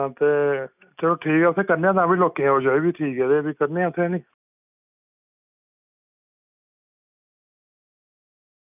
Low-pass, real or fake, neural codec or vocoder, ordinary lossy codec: 3.6 kHz; real; none; none